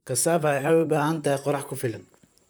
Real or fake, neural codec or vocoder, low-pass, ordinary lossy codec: fake; vocoder, 44.1 kHz, 128 mel bands, Pupu-Vocoder; none; none